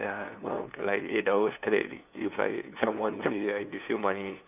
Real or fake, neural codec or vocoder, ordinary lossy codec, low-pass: fake; codec, 16 kHz, 2 kbps, FunCodec, trained on LibriTTS, 25 frames a second; none; 3.6 kHz